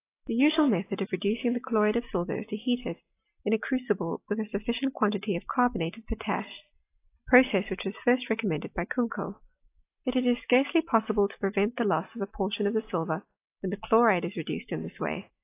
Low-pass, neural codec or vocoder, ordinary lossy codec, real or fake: 3.6 kHz; none; AAC, 24 kbps; real